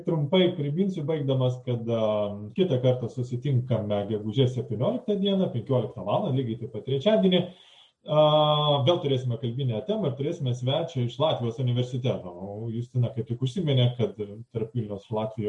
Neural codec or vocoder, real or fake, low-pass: none; real; 10.8 kHz